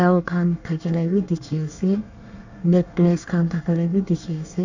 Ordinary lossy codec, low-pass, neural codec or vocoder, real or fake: none; 7.2 kHz; codec, 24 kHz, 1 kbps, SNAC; fake